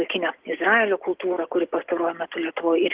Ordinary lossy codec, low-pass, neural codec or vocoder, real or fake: Opus, 16 kbps; 3.6 kHz; none; real